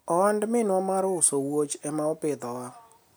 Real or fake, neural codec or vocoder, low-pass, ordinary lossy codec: real; none; none; none